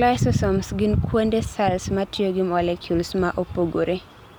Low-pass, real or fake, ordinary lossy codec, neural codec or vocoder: none; real; none; none